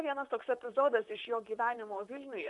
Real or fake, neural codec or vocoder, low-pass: fake; vocoder, 44.1 kHz, 128 mel bands, Pupu-Vocoder; 10.8 kHz